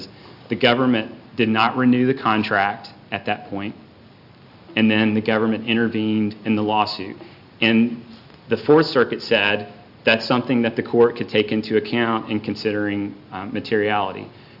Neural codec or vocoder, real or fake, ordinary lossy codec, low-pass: none; real; Opus, 64 kbps; 5.4 kHz